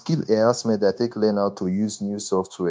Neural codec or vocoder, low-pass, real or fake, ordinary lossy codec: codec, 16 kHz, 0.9 kbps, LongCat-Audio-Codec; none; fake; none